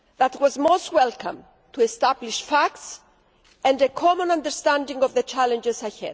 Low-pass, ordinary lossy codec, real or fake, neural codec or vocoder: none; none; real; none